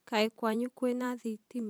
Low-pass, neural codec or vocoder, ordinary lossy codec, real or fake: none; vocoder, 44.1 kHz, 128 mel bands every 512 samples, BigVGAN v2; none; fake